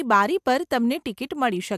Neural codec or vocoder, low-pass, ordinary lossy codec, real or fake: none; 14.4 kHz; AAC, 96 kbps; real